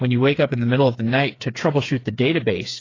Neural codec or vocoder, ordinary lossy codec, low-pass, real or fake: codec, 16 kHz, 4 kbps, FreqCodec, smaller model; AAC, 32 kbps; 7.2 kHz; fake